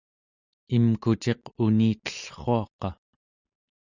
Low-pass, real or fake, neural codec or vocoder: 7.2 kHz; real; none